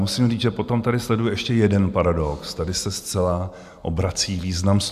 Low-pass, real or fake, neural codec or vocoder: 14.4 kHz; real; none